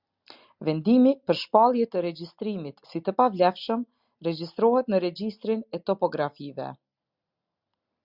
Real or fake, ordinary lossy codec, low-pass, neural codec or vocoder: real; Opus, 64 kbps; 5.4 kHz; none